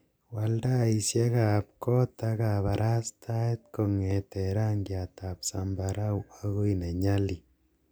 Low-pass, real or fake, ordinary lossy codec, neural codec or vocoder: none; real; none; none